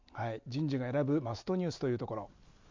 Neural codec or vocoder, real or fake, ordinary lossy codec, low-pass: none; real; MP3, 64 kbps; 7.2 kHz